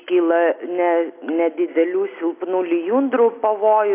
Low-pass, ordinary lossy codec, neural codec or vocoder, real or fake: 3.6 kHz; AAC, 24 kbps; none; real